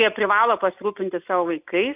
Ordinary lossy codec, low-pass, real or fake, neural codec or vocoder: AAC, 32 kbps; 3.6 kHz; real; none